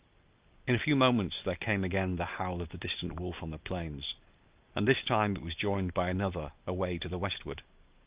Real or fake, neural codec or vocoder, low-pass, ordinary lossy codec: real; none; 3.6 kHz; Opus, 32 kbps